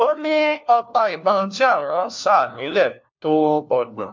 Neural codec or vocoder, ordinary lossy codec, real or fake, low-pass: codec, 16 kHz, 1 kbps, FunCodec, trained on LibriTTS, 50 frames a second; MP3, 48 kbps; fake; 7.2 kHz